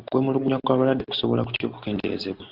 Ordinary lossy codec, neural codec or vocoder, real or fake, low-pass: Opus, 16 kbps; none; real; 5.4 kHz